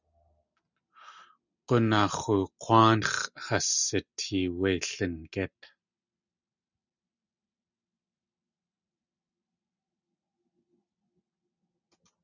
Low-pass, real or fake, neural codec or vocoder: 7.2 kHz; real; none